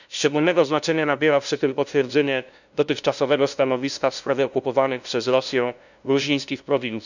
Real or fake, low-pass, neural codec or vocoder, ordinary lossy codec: fake; 7.2 kHz; codec, 16 kHz, 0.5 kbps, FunCodec, trained on LibriTTS, 25 frames a second; none